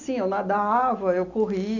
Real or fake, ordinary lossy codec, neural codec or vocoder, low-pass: real; none; none; 7.2 kHz